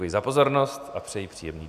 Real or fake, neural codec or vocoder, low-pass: real; none; 14.4 kHz